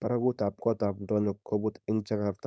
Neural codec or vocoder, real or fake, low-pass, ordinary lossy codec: codec, 16 kHz, 4.8 kbps, FACodec; fake; none; none